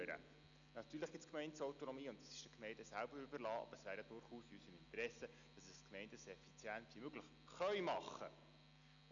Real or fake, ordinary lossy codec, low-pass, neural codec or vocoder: real; none; 7.2 kHz; none